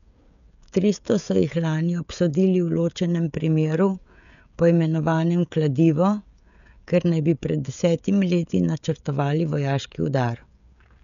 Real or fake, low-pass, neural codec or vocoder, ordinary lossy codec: fake; 7.2 kHz; codec, 16 kHz, 16 kbps, FreqCodec, smaller model; none